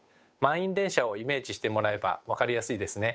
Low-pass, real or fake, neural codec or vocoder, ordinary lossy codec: none; fake; codec, 16 kHz, 8 kbps, FunCodec, trained on Chinese and English, 25 frames a second; none